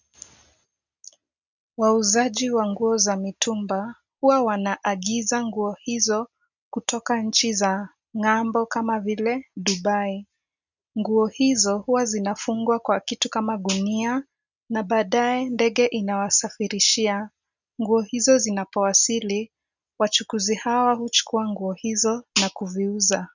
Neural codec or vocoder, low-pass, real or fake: none; 7.2 kHz; real